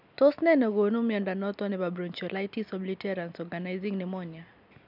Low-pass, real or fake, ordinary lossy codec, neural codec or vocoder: 5.4 kHz; real; none; none